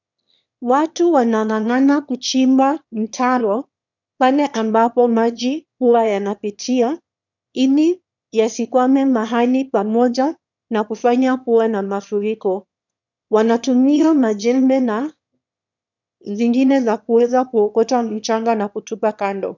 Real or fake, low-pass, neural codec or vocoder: fake; 7.2 kHz; autoencoder, 22.05 kHz, a latent of 192 numbers a frame, VITS, trained on one speaker